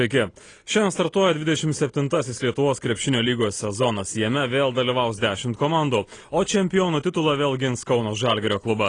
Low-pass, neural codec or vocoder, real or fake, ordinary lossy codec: 9.9 kHz; none; real; AAC, 32 kbps